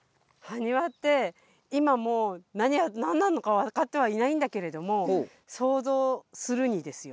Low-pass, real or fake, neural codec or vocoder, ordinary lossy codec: none; real; none; none